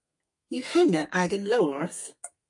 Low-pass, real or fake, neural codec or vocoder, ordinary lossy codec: 10.8 kHz; fake; codec, 32 kHz, 1.9 kbps, SNAC; MP3, 48 kbps